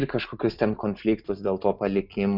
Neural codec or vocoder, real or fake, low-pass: none; real; 5.4 kHz